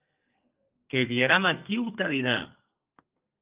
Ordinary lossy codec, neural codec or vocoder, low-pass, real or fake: Opus, 24 kbps; codec, 32 kHz, 1.9 kbps, SNAC; 3.6 kHz; fake